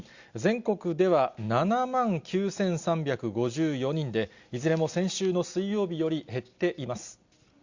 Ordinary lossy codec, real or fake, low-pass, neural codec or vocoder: Opus, 64 kbps; real; 7.2 kHz; none